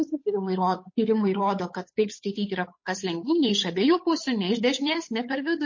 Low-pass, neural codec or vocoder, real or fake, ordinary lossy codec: 7.2 kHz; codec, 16 kHz, 8 kbps, FunCodec, trained on Chinese and English, 25 frames a second; fake; MP3, 32 kbps